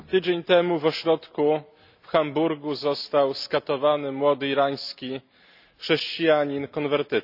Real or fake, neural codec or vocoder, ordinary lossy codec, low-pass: real; none; none; 5.4 kHz